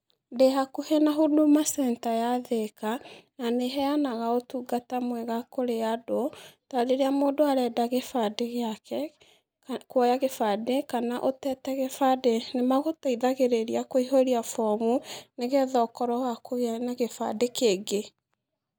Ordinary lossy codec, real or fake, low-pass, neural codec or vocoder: none; real; none; none